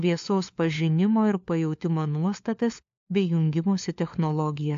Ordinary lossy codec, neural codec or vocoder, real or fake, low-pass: AAC, 64 kbps; codec, 16 kHz, 4 kbps, FunCodec, trained on LibriTTS, 50 frames a second; fake; 7.2 kHz